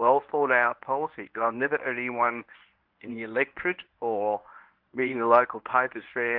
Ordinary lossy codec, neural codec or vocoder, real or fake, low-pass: Opus, 24 kbps; codec, 24 kHz, 0.9 kbps, WavTokenizer, medium speech release version 1; fake; 5.4 kHz